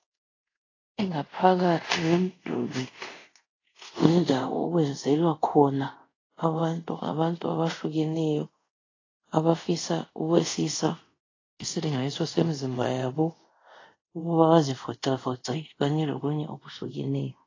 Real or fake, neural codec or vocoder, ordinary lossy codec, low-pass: fake; codec, 24 kHz, 0.5 kbps, DualCodec; AAC, 32 kbps; 7.2 kHz